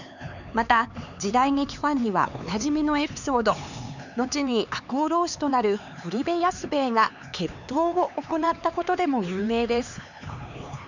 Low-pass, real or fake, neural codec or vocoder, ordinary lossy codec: 7.2 kHz; fake; codec, 16 kHz, 4 kbps, X-Codec, HuBERT features, trained on LibriSpeech; none